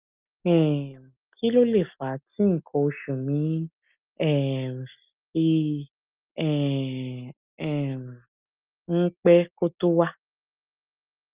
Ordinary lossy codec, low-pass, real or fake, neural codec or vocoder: Opus, 24 kbps; 3.6 kHz; real; none